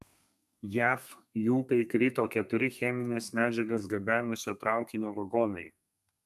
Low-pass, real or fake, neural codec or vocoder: 14.4 kHz; fake; codec, 32 kHz, 1.9 kbps, SNAC